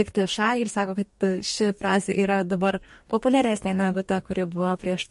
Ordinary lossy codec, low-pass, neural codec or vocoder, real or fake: MP3, 48 kbps; 14.4 kHz; codec, 44.1 kHz, 2.6 kbps, DAC; fake